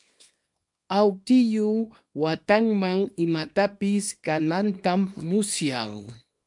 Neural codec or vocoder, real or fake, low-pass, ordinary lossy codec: codec, 24 kHz, 0.9 kbps, WavTokenizer, small release; fake; 10.8 kHz; MP3, 64 kbps